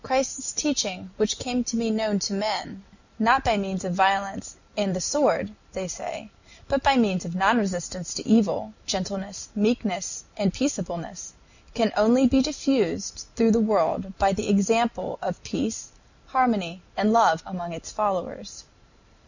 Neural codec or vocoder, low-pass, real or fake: none; 7.2 kHz; real